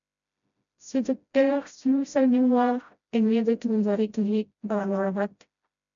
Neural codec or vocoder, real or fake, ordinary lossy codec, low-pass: codec, 16 kHz, 0.5 kbps, FreqCodec, smaller model; fake; MP3, 96 kbps; 7.2 kHz